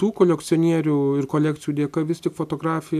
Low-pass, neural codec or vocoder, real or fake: 14.4 kHz; none; real